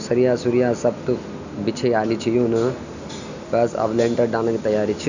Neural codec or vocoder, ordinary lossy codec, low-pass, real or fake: none; none; 7.2 kHz; real